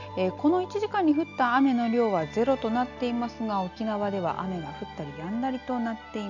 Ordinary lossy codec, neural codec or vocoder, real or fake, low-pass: none; none; real; 7.2 kHz